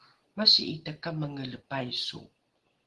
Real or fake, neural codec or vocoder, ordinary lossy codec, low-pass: real; none; Opus, 16 kbps; 10.8 kHz